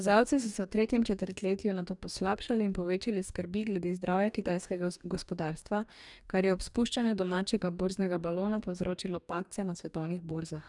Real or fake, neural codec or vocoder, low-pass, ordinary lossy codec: fake; codec, 44.1 kHz, 2.6 kbps, DAC; 10.8 kHz; none